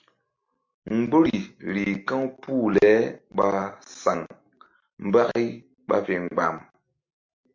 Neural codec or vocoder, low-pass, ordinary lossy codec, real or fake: none; 7.2 kHz; MP3, 48 kbps; real